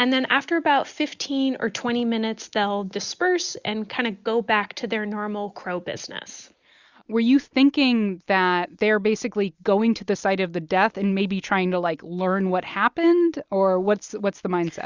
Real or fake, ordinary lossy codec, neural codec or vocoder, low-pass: real; Opus, 64 kbps; none; 7.2 kHz